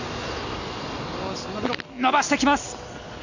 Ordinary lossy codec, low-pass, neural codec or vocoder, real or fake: none; 7.2 kHz; none; real